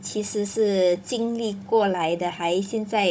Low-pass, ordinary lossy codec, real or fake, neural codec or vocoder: none; none; fake; codec, 16 kHz, 16 kbps, FunCodec, trained on Chinese and English, 50 frames a second